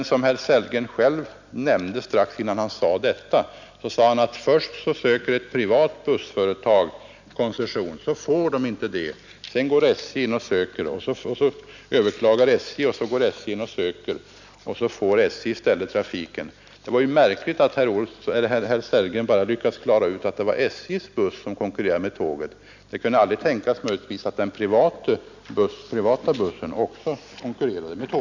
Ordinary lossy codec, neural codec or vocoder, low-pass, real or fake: none; none; 7.2 kHz; real